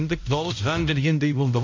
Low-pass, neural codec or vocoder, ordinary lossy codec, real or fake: 7.2 kHz; codec, 16 kHz, 0.5 kbps, X-Codec, HuBERT features, trained on balanced general audio; MP3, 48 kbps; fake